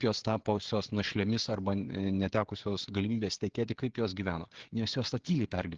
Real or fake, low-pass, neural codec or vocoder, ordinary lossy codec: fake; 7.2 kHz; codec, 16 kHz, 16 kbps, FreqCodec, smaller model; Opus, 16 kbps